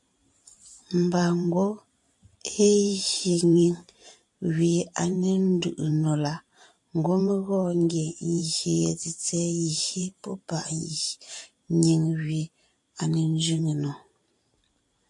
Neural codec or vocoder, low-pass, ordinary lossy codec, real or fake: vocoder, 44.1 kHz, 128 mel bands every 512 samples, BigVGAN v2; 10.8 kHz; AAC, 64 kbps; fake